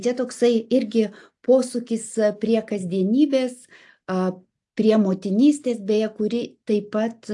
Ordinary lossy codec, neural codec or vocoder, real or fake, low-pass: AAC, 64 kbps; none; real; 10.8 kHz